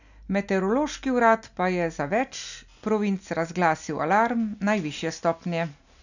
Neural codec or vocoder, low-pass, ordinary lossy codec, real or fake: none; 7.2 kHz; none; real